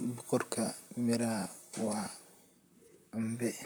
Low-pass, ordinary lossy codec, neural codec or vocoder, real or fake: none; none; vocoder, 44.1 kHz, 128 mel bands, Pupu-Vocoder; fake